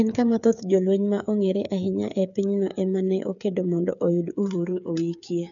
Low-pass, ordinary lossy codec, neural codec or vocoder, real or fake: 7.2 kHz; none; codec, 16 kHz, 8 kbps, FreqCodec, smaller model; fake